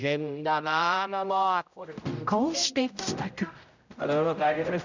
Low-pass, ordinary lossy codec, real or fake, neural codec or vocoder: 7.2 kHz; none; fake; codec, 16 kHz, 0.5 kbps, X-Codec, HuBERT features, trained on general audio